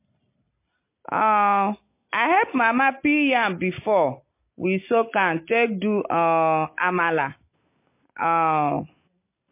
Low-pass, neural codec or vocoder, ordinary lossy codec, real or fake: 3.6 kHz; none; MP3, 24 kbps; real